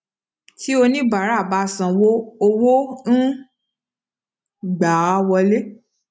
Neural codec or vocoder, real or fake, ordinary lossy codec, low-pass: none; real; none; none